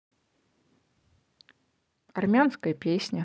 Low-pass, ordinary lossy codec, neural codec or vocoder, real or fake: none; none; none; real